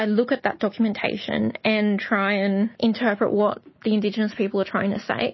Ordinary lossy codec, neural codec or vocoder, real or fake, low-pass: MP3, 24 kbps; none; real; 7.2 kHz